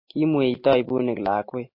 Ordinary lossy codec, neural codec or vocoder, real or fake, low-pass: MP3, 32 kbps; none; real; 5.4 kHz